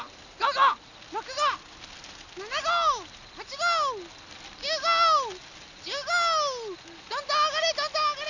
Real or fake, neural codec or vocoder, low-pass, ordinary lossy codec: real; none; 7.2 kHz; none